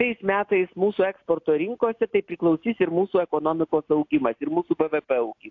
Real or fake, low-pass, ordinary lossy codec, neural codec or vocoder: real; 7.2 kHz; AAC, 48 kbps; none